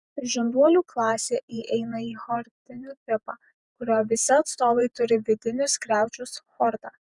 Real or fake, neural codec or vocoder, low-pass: fake; vocoder, 48 kHz, 128 mel bands, Vocos; 10.8 kHz